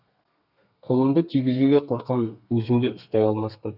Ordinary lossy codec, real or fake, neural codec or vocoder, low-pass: none; fake; codec, 32 kHz, 1.9 kbps, SNAC; 5.4 kHz